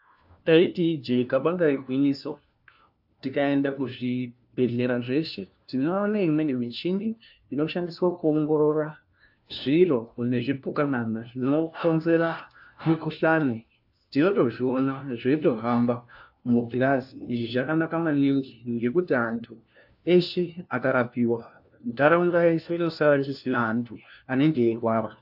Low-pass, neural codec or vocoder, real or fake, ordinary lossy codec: 5.4 kHz; codec, 16 kHz, 1 kbps, FunCodec, trained on LibriTTS, 50 frames a second; fake; AAC, 48 kbps